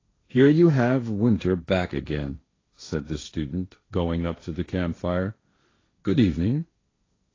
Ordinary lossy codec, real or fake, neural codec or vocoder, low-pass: AAC, 32 kbps; fake; codec, 16 kHz, 1.1 kbps, Voila-Tokenizer; 7.2 kHz